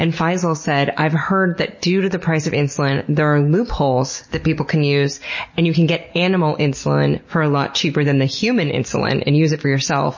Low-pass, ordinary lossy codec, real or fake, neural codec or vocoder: 7.2 kHz; MP3, 32 kbps; real; none